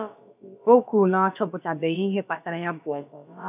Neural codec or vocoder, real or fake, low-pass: codec, 16 kHz, about 1 kbps, DyCAST, with the encoder's durations; fake; 3.6 kHz